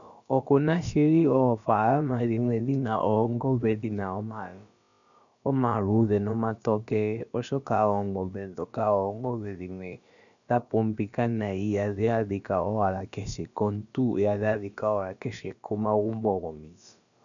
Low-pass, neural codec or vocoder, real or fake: 7.2 kHz; codec, 16 kHz, about 1 kbps, DyCAST, with the encoder's durations; fake